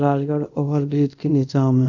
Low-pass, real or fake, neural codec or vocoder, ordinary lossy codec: 7.2 kHz; fake; codec, 24 kHz, 0.5 kbps, DualCodec; none